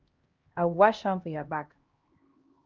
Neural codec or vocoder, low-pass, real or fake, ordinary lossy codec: codec, 16 kHz, 1 kbps, X-Codec, HuBERT features, trained on LibriSpeech; 7.2 kHz; fake; Opus, 24 kbps